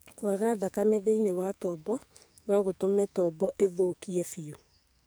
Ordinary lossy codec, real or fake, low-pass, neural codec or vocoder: none; fake; none; codec, 44.1 kHz, 2.6 kbps, SNAC